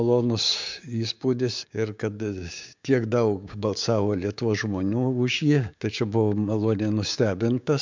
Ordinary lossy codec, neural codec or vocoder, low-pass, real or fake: MP3, 64 kbps; codec, 16 kHz, 6 kbps, DAC; 7.2 kHz; fake